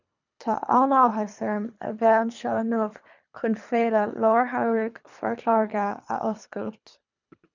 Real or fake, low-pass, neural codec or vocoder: fake; 7.2 kHz; codec, 24 kHz, 3 kbps, HILCodec